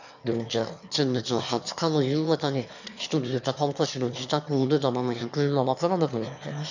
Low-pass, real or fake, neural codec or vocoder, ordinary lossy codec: 7.2 kHz; fake; autoencoder, 22.05 kHz, a latent of 192 numbers a frame, VITS, trained on one speaker; none